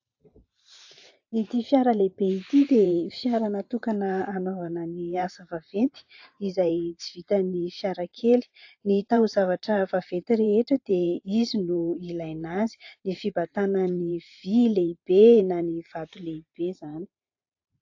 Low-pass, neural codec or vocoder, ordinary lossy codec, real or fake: 7.2 kHz; vocoder, 44.1 kHz, 128 mel bands every 512 samples, BigVGAN v2; AAC, 48 kbps; fake